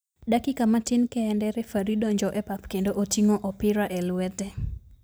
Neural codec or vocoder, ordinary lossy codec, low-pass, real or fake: none; none; none; real